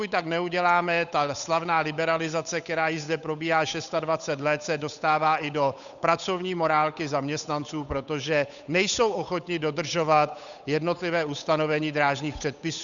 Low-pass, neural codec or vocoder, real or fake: 7.2 kHz; codec, 16 kHz, 8 kbps, FunCodec, trained on Chinese and English, 25 frames a second; fake